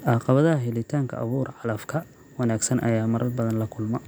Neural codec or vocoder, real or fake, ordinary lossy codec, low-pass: none; real; none; none